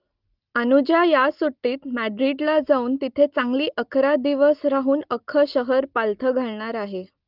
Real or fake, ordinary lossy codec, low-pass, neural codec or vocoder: real; Opus, 32 kbps; 5.4 kHz; none